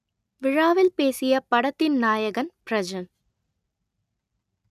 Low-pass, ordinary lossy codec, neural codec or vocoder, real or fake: 14.4 kHz; none; none; real